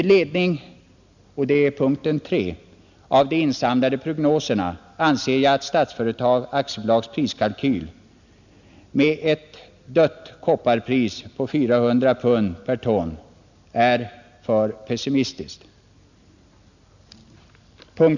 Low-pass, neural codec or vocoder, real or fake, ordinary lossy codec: 7.2 kHz; none; real; none